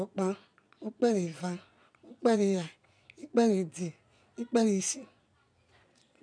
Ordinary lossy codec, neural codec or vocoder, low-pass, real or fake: none; vocoder, 22.05 kHz, 80 mel bands, Vocos; 9.9 kHz; fake